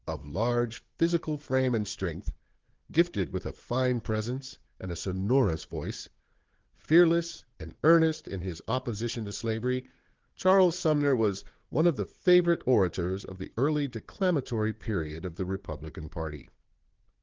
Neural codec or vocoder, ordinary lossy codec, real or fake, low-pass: codec, 16 kHz, 4 kbps, FunCodec, trained on Chinese and English, 50 frames a second; Opus, 16 kbps; fake; 7.2 kHz